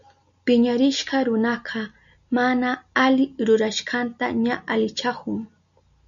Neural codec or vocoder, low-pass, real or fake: none; 7.2 kHz; real